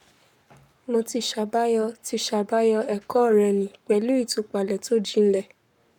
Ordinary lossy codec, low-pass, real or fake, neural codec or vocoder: none; 19.8 kHz; fake; codec, 44.1 kHz, 7.8 kbps, Pupu-Codec